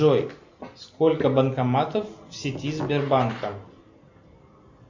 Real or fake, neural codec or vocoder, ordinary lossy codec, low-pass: real; none; MP3, 64 kbps; 7.2 kHz